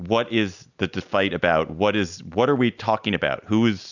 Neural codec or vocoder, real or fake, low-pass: none; real; 7.2 kHz